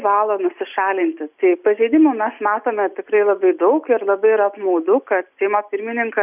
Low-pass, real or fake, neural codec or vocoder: 3.6 kHz; real; none